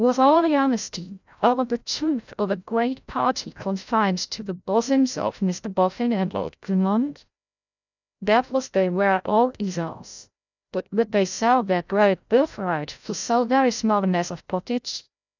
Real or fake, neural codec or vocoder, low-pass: fake; codec, 16 kHz, 0.5 kbps, FreqCodec, larger model; 7.2 kHz